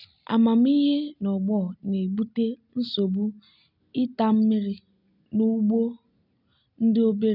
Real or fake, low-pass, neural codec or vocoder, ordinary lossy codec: real; 5.4 kHz; none; none